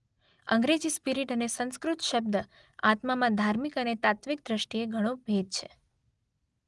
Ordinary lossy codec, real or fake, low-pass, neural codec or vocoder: Opus, 24 kbps; real; 10.8 kHz; none